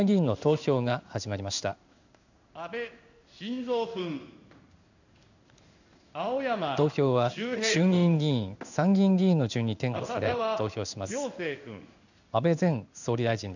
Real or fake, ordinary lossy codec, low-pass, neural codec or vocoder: fake; none; 7.2 kHz; codec, 16 kHz in and 24 kHz out, 1 kbps, XY-Tokenizer